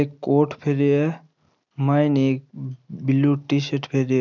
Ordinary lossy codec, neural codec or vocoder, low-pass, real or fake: none; none; 7.2 kHz; real